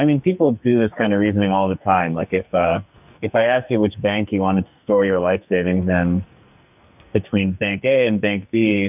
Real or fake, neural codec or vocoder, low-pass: fake; codec, 44.1 kHz, 2.6 kbps, SNAC; 3.6 kHz